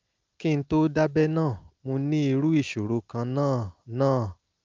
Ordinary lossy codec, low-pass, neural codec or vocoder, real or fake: Opus, 24 kbps; 7.2 kHz; none; real